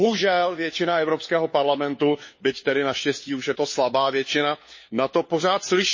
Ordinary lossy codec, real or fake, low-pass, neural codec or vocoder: MP3, 32 kbps; fake; 7.2 kHz; codec, 16 kHz, 4 kbps, FunCodec, trained on LibriTTS, 50 frames a second